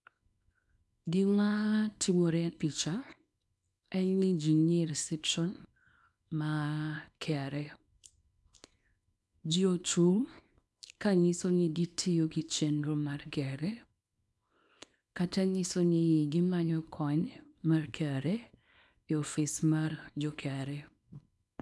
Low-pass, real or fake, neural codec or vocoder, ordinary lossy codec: none; fake; codec, 24 kHz, 0.9 kbps, WavTokenizer, small release; none